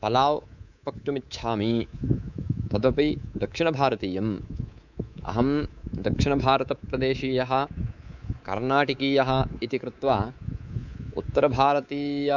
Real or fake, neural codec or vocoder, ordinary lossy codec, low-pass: fake; codec, 24 kHz, 3.1 kbps, DualCodec; none; 7.2 kHz